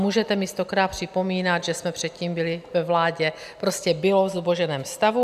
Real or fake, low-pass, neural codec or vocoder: real; 14.4 kHz; none